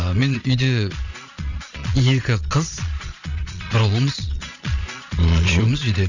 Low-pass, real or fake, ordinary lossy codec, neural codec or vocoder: 7.2 kHz; fake; none; vocoder, 22.05 kHz, 80 mel bands, Vocos